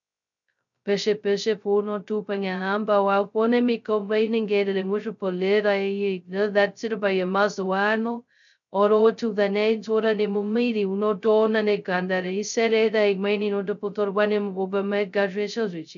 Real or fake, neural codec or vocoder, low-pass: fake; codec, 16 kHz, 0.2 kbps, FocalCodec; 7.2 kHz